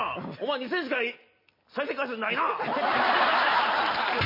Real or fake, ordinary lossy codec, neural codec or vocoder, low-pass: real; MP3, 24 kbps; none; 5.4 kHz